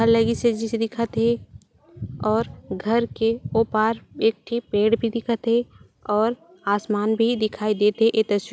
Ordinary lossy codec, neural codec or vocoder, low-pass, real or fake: none; none; none; real